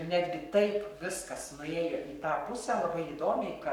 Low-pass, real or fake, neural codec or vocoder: 19.8 kHz; fake; codec, 44.1 kHz, 7.8 kbps, Pupu-Codec